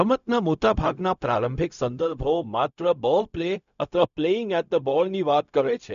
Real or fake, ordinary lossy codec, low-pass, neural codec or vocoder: fake; none; 7.2 kHz; codec, 16 kHz, 0.4 kbps, LongCat-Audio-Codec